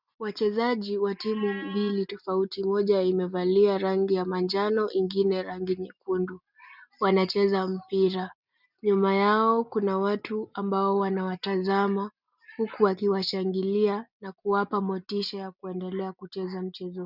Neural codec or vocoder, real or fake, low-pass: none; real; 5.4 kHz